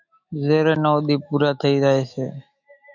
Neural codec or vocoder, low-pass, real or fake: autoencoder, 48 kHz, 128 numbers a frame, DAC-VAE, trained on Japanese speech; 7.2 kHz; fake